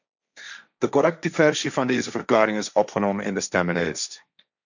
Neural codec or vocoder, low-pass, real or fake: codec, 16 kHz, 1.1 kbps, Voila-Tokenizer; 7.2 kHz; fake